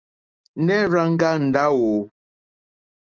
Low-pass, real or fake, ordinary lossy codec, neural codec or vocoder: 7.2 kHz; real; Opus, 24 kbps; none